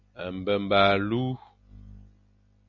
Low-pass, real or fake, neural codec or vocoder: 7.2 kHz; real; none